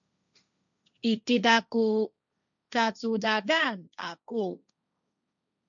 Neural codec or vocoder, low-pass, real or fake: codec, 16 kHz, 1.1 kbps, Voila-Tokenizer; 7.2 kHz; fake